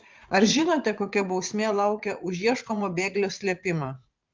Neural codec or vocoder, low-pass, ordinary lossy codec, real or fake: vocoder, 44.1 kHz, 80 mel bands, Vocos; 7.2 kHz; Opus, 32 kbps; fake